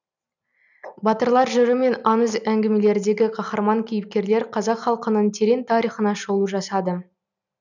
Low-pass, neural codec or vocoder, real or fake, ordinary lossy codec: 7.2 kHz; none; real; none